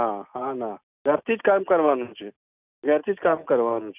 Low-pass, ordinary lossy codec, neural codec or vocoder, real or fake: 3.6 kHz; none; none; real